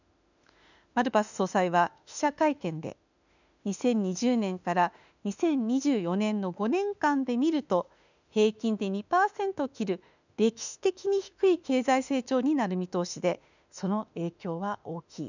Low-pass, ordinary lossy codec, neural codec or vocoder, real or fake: 7.2 kHz; none; autoencoder, 48 kHz, 32 numbers a frame, DAC-VAE, trained on Japanese speech; fake